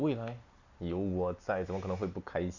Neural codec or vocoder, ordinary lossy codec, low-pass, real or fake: none; none; 7.2 kHz; real